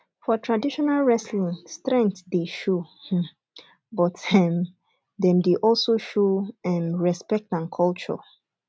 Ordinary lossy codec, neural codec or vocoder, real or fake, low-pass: none; none; real; none